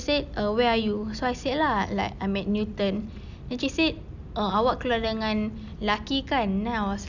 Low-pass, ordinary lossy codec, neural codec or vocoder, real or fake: 7.2 kHz; none; none; real